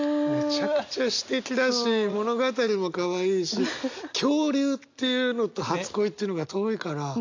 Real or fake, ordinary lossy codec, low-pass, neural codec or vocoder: real; AAC, 48 kbps; 7.2 kHz; none